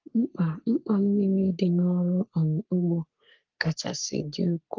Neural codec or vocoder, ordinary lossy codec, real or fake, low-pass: codec, 44.1 kHz, 3.4 kbps, Pupu-Codec; Opus, 32 kbps; fake; 7.2 kHz